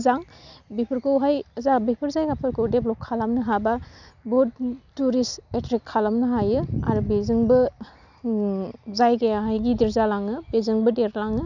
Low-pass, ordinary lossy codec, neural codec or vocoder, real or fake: 7.2 kHz; none; none; real